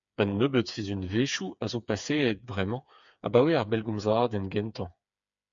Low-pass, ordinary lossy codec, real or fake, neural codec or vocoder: 7.2 kHz; MP3, 64 kbps; fake; codec, 16 kHz, 4 kbps, FreqCodec, smaller model